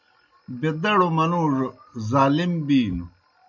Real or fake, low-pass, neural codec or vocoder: real; 7.2 kHz; none